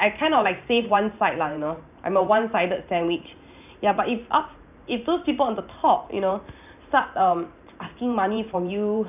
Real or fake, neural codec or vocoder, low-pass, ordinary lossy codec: fake; vocoder, 44.1 kHz, 128 mel bands every 512 samples, BigVGAN v2; 3.6 kHz; none